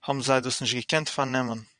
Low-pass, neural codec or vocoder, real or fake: 9.9 kHz; vocoder, 22.05 kHz, 80 mel bands, Vocos; fake